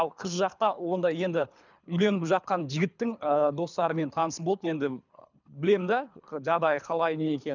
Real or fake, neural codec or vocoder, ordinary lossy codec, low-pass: fake; codec, 24 kHz, 3 kbps, HILCodec; none; 7.2 kHz